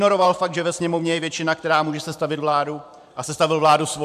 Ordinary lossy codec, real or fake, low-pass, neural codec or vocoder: MP3, 96 kbps; fake; 14.4 kHz; vocoder, 44.1 kHz, 128 mel bands every 512 samples, BigVGAN v2